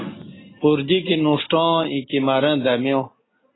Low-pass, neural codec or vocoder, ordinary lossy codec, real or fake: 7.2 kHz; none; AAC, 16 kbps; real